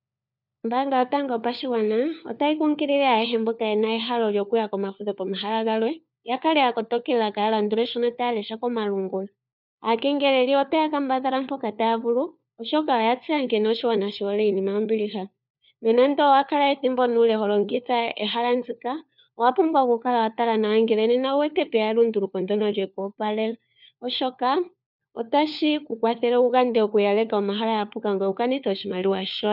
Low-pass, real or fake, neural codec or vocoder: 5.4 kHz; fake; codec, 16 kHz, 4 kbps, FunCodec, trained on LibriTTS, 50 frames a second